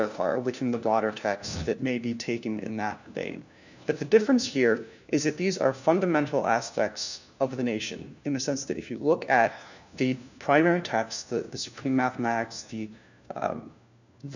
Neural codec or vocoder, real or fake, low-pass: codec, 16 kHz, 1 kbps, FunCodec, trained on LibriTTS, 50 frames a second; fake; 7.2 kHz